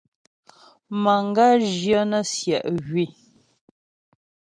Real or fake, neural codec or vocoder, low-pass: real; none; 9.9 kHz